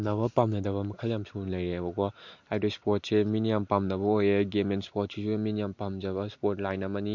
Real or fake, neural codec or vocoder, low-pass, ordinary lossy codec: real; none; 7.2 kHz; MP3, 48 kbps